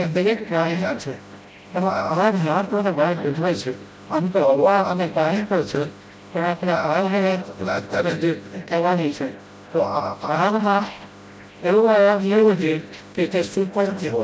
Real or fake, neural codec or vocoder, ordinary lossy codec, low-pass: fake; codec, 16 kHz, 0.5 kbps, FreqCodec, smaller model; none; none